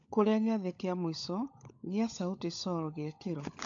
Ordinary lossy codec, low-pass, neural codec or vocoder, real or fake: AAC, 64 kbps; 7.2 kHz; codec, 16 kHz, 16 kbps, FunCodec, trained on LibriTTS, 50 frames a second; fake